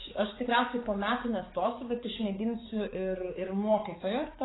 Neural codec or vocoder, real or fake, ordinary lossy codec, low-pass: codec, 16 kHz, 4 kbps, X-Codec, HuBERT features, trained on balanced general audio; fake; AAC, 16 kbps; 7.2 kHz